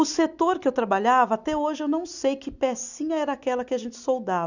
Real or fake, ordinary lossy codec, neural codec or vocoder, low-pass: real; none; none; 7.2 kHz